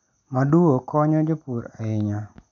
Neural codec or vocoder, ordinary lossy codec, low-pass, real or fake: none; none; 7.2 kHz; real